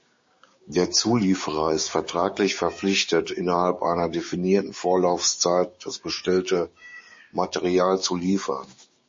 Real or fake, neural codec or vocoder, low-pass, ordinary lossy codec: fake; codec, 16 kHz, 6 kbps, DAC; 7.2 kHz; MP3, 32 kbps